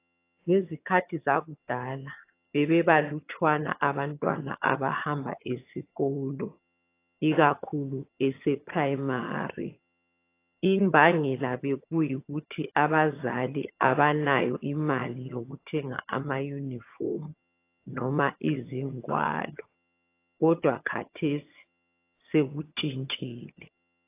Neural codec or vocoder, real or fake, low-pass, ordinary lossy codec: vocoder, 22.05 kHz, 80 mel bands, HiFi-GAN; fake; 3.6 kHz; AAC, 24 kbps